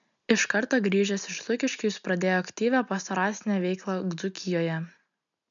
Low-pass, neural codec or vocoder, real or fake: 7.2 kHz; none; real